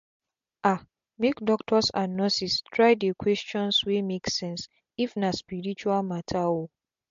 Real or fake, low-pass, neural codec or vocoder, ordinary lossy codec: real; 7.2 kHz; none; MP3, 48 kbps